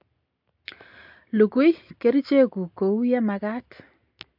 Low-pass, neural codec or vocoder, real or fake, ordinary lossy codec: 5.4 kHz; none; real; MP3, 48 kbps